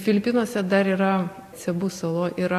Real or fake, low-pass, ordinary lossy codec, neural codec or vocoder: real; 14.4 kHz; AAC, 64 kbps; none